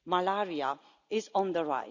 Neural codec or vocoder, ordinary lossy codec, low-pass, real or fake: none; none; 7.2 kHz; real